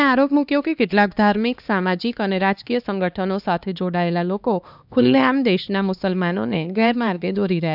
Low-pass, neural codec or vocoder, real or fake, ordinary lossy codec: 5.4 kHz; codec, 16 kHz, 2 kbps, X-Codec, HuBERT features, trained on LibriSpeech; fake; none